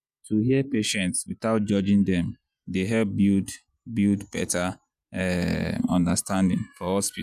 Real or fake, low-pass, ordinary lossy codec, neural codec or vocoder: fake; 14.4 kHz; none; vocoder, 48 kHz, 128 mel bands, Vocos